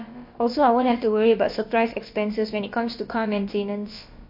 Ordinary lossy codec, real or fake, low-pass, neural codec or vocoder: MP3, 32 kbps; fake; 5.4 kHz; codec, 16 kHz, about 1 kbps, DyCAST, with the encoder's durations